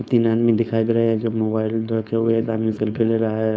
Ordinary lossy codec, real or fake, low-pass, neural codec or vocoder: none; fake; none; codec, 16 kHz, 4.8 kbps, FACodec